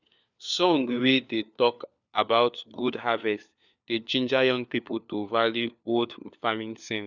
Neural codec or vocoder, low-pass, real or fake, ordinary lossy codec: codec, 16 kHz, 4 kbps, FunCodec, trained on LibriTTS, 50 frames a second; 7.2 kHz; fake; none